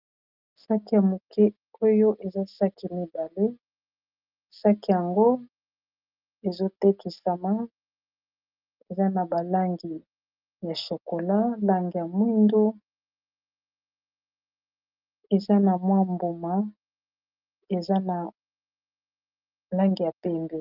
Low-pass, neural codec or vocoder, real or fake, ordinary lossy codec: 5.4 kHz; none; real; Opus, 24 kbps